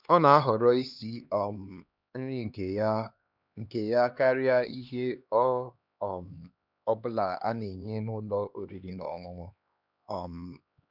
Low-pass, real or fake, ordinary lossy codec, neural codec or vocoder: 5.4 kHz; fake; none; codec, 16 kHz, 2 kbps, X-Codec, HuBERT features, trained on LibriSpeech